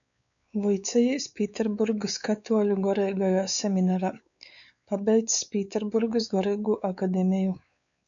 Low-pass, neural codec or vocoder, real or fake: 7.2 kHz; codec, 16 kHz, 4 kbps, X-Codec, WavLM features, trained on Multilingual LibriSpeech; fake